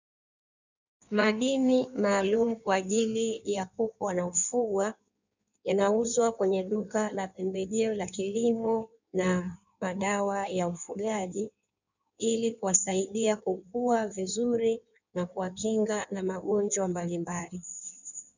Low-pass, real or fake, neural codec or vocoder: 7.2 kHz; fake; codec, 16 kHz in and 24 kHz out, 1.1 kbps, FireRedTTS-2 codec